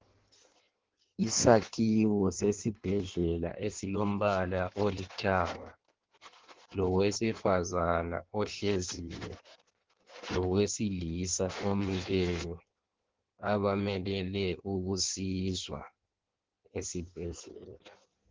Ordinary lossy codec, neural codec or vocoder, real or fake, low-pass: Opus, 16 kbps; codec, 16 kHz in and 24 kHz out, 1.1 kbps, FireRedTTS-2 codec; fake; 7.2 kHz